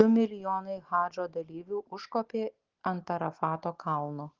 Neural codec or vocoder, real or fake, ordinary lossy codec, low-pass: none; real; Opus, 16 kbps; 7.2 kHz